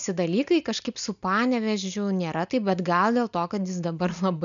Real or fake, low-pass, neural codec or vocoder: real; 7.2 kHz; none